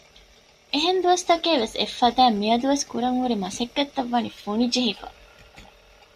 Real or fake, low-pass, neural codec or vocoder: real; 14.4 kHz; none